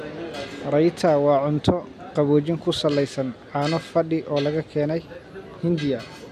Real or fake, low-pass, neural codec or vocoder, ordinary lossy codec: real; 14.4 kHz; none; none